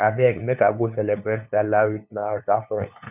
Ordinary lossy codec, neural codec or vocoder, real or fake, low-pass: none; codec, 16 kHz, 2 kbps, FunCodec, trained on LibriTTS, 25 frames a second; fake; 3.6 kHz